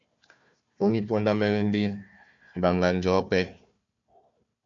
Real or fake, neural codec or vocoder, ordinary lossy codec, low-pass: fake; codec, 16 kHz, 1 kbps, FunCodec, trained on Chinese and English, 50 frames a second; MP3, 64 kbps; 7.2 kHz